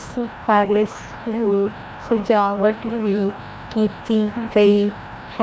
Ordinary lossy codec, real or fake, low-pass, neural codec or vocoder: none; fake; none; codec, 16 kHz, 1 kbps, FreqCodec, larger model